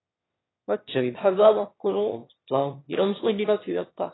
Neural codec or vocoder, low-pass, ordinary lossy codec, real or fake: autoencoder, 22.05 kHz, a latent of 192 numbers a frame, VITS, trained on one speaker; 7.2 kHz; AAC, 16 kbps; fake